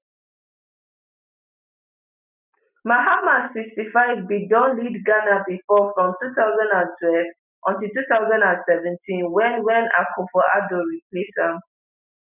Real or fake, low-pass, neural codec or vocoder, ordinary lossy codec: real; 3.6 kHz; none; none